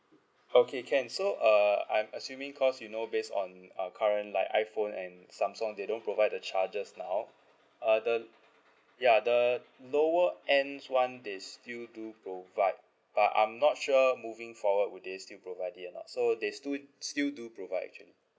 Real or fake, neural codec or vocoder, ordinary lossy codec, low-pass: real; none; none; none